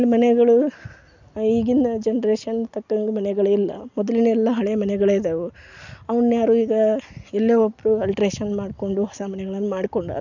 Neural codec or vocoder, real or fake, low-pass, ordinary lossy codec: none; real; 7.2 kHz; none